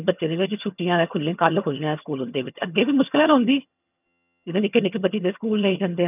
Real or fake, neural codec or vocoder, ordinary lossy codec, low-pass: fake; vocoder, 22.05 kHz, 80 mel bands, HiFi-GAN; none; 3.6 kHz